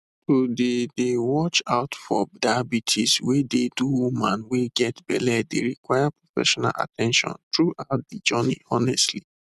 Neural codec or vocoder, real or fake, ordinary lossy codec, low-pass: none; real; none; 14.4 kHz